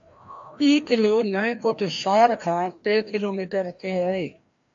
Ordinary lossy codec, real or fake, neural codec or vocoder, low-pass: AAC, 64 kbps; fake; codec, 16 kHz, 1 kbps, FreqCodec, larger model; 7.2 kHz